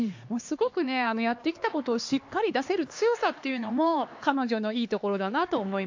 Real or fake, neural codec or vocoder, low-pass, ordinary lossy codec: fake; codec, 16 kHz, 2 kbps, X-Codec, HuBERT features, trained on LibriSpeech; 7.2 kHz; AAC, 48 kbps